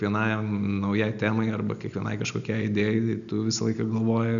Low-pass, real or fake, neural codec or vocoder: 7.2 kHz; real; none